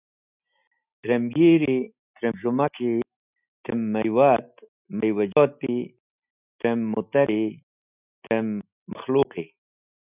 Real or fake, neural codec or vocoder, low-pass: real; none; 3.6 kHz